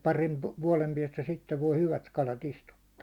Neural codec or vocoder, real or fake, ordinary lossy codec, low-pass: none; real; Opus, 64 kbps; 19.8 kHz